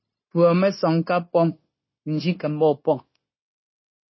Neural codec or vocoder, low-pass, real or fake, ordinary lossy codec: codec, 16 kHz, 0.9 kbps, LongCat-Audio-Codec; 7.2 kHz; fake; MP3, 24 kbps